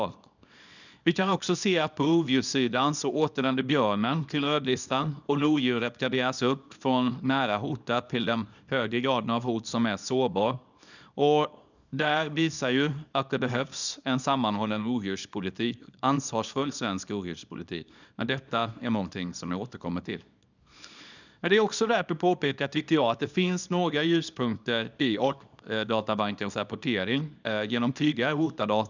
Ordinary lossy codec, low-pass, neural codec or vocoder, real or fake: none; 7.2 kHz; codec, 24 kHz, 0.9 kbps, WavTokenizer, small release; fake